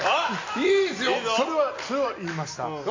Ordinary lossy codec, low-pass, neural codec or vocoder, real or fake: MP3, 48 kbps; 7.2 kHz; none; real